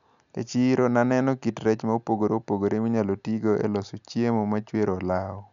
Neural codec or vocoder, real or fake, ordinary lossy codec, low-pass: none; real; none; 7.2 kHz